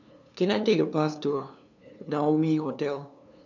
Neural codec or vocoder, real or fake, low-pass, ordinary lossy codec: codec, 16 kHz, 2 kbps, FunCodec, trained on LibriTTS, 25 frames a second; fake; 7.2 kHz; none